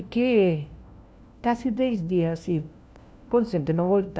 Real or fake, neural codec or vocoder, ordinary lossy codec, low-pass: fake; codec, 16 kHz, 1 kbps, FunCodec, trained on LibriTTS, 50 frames a second; none; none